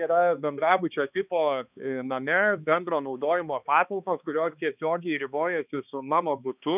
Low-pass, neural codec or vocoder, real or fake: 3.6 kHz; codec, 16 kHz, 2 kbps, X-Codec, HuBERT features, trained on balanced general audio; fake